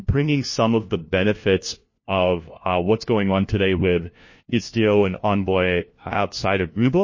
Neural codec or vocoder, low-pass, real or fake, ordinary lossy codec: codec, 16 kHz, 1 kbps, FunCodec, trained on LibriTTS, 50 frames a second; 7.2 kHz; fake; MP3, 32 kbps